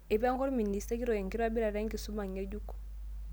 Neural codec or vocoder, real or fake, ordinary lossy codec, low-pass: none; real; none; none